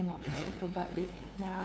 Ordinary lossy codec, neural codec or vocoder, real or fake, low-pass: none; codec, 16 kHz, 2 kbps, FunCodec, trained on LibriTTS, 25 frames a second; fake; none